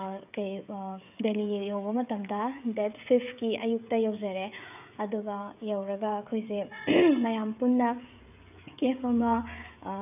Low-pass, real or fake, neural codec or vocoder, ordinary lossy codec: 3.6 kHz; fake; codec, 16 kHz, 16 kbps, FreqCodec, smaller model; none